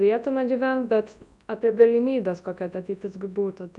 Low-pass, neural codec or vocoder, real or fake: 10.8 kHz; codec, 24 kHz, 0.9 kbps, WavTokenizer, large speech release; fake